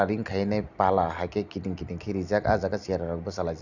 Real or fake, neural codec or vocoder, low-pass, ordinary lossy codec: real; none; 7.2 kHz; none